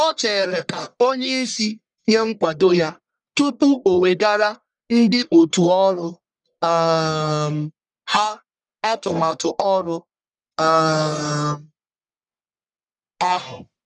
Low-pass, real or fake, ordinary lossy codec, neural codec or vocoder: 10.8 kHz; fake; none; codec, 44.1 kHz, 1.7 kbps, Pupu-Codec